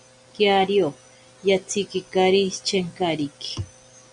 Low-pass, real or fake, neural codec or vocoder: 9.9 kHz; real; none